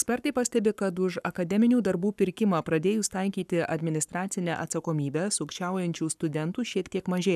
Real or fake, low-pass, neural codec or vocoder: fake; 14.4 kHz; codec, 44.1 kHz, 7.8 kbps, Pupu-Codec